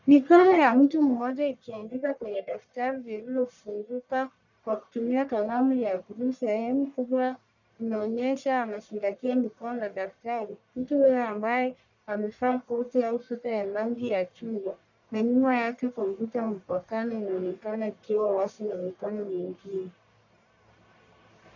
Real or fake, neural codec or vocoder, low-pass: fake; codec, 44.1 kHz, 1.7 kbps, Pupu-Codec; 7.2 kHz